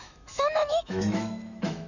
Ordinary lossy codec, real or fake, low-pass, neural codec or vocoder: none; fake; 7.2 kHz; autoencoder, 48 kHz, 128 numbers a frame, DAC-VAE, trained on Japanese speech